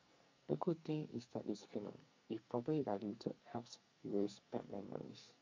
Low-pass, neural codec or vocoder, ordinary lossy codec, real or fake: 7.2 kHz; codec, 44.1 kHz, 2.6 kbps, SNAC; MP3, 48 kbps; fake